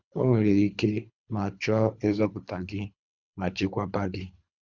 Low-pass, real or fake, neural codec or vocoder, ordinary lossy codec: 7.2 kHz; fake; codec, 24 kHz, 3 kbps, HILCodec; Opus, 64 kbps